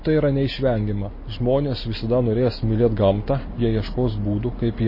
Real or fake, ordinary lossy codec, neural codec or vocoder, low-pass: real; MP3, 24 kbps; none; 5.4 kHz